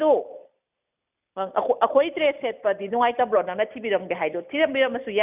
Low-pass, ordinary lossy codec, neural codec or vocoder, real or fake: 3.6 kHz; none; none; real